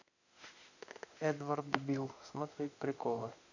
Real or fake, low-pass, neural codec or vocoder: fake; 7.2 kHz; autoencoder, 48 kHz, 32 numbers a frame, DAC-VAE, trained on Japanese speech